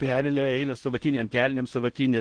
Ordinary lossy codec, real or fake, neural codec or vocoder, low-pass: Opus, 16 kbps; fake; codec, 16 kHz in and 24 kHz out, 0.8 kbps, FocalCodec, streaming, 65536 codes; 9.9 kHz